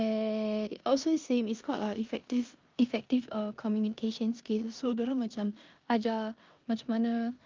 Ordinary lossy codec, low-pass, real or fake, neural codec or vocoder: Opus, 32 kbps; 7.2 kHz; fake; codec, 16 kHz in and 24 kHz out, 0.9 kbps, LongCat-Audio-Codec, four codebook decoder